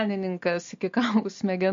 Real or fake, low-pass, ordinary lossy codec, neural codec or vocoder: real; 7.2 kHz; MP3, 48 kbps; none